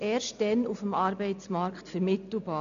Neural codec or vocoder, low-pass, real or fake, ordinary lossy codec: none; 7.2 kHz; real; MP3, 96 kbps